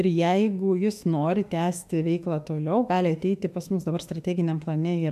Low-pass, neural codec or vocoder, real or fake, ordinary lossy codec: 14.4 kHz; autoencoder, 48 kHz, 32 numbers a frame, DAC-VAE, trained on Japanese speech; fake; MP3, 96 kbps